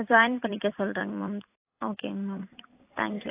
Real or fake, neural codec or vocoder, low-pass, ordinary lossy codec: fake; vocoder, 44.1 kHz, 128 mel bands every 256 samples, BigVGAN v2; 3.6 kHz; AAC, 24 kbps